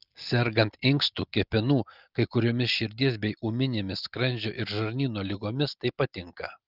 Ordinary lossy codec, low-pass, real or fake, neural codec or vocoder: Opus, 24 kbps; 5.4 kHz; real; none